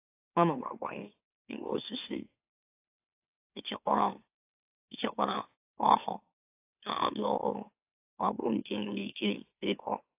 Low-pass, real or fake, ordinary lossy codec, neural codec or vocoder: 3.6 kHz; fake; AAC, 24 kbps; autoencoder, 44.1 kHz, a latent of 192 numbers a frame, MeloTTS